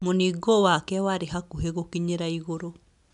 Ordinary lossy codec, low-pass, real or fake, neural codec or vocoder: none; 10.8 kHz; real; none